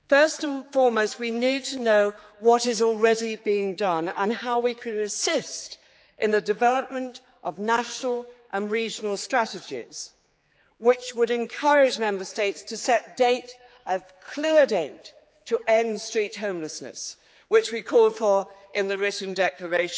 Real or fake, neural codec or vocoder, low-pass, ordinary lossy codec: fake; codec, 16 kHz, 4 kbps, X-Codec, HuBERT features, trained on general audio; none; none